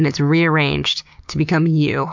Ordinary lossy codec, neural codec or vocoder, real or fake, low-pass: MP3, 64 kbps; codec, 16 kHz, 6 kbps, DAC; fake; 7.2 kHz